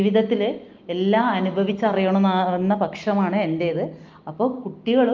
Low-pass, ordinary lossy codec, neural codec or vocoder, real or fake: 7.2 kHz; Opus, 32 kbps; none; real